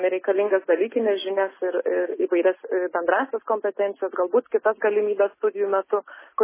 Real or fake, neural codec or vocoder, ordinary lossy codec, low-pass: real; none; MP3, 16 kbps; 3.6 kHz